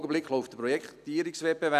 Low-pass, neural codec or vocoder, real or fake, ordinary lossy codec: 14.4 kHz; none; real; none